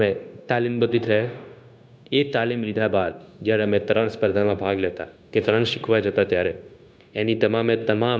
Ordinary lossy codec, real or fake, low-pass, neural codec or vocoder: none; fake; none; codec, 16 kHz, 0.9 kbps, LongCat-Audio-Codec